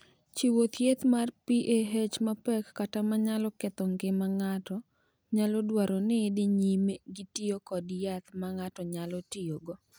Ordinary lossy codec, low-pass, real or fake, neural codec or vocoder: none; none; real; none